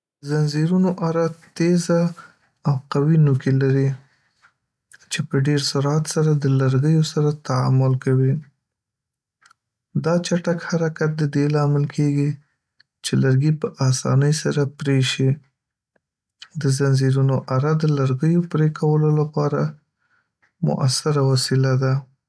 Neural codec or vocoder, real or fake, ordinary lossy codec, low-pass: none; real; none; none